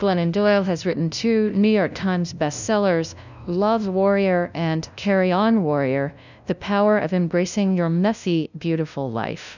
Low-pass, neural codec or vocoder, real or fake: 7.2 kHz; codec, 16 kHz, 0.5 kbps, FunCodec, trained on LibriTTS, 25 frames a second; fake